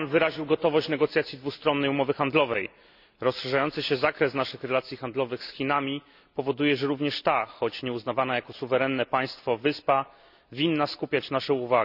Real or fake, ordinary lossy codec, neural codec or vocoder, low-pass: real; none; none; 5.4 kHz